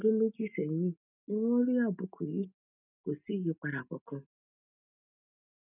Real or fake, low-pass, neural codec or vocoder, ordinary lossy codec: real; 3.6 kHz; none; none